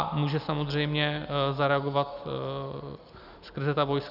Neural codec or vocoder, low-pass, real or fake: none; 5.4 kHz; real